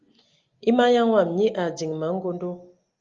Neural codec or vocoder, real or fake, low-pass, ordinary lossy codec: none; real; 7.2 kHz; Opus, 32 kbps